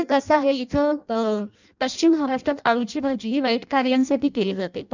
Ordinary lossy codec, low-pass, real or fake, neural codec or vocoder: none; 7.2 kHz; fake; codec, 16 kHz in and 24 kHz out, 0.6 kbps, FireRedTTS-2 codec